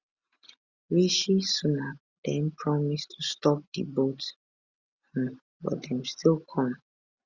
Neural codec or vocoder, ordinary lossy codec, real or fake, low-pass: none; Opus, 64 kbps; real; 7.2 kHz